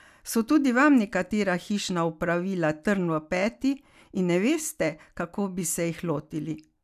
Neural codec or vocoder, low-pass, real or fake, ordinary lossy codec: none; 14.4 kHz; real; none